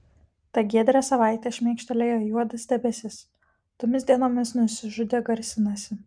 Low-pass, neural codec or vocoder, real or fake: 9.9 kHz; none; real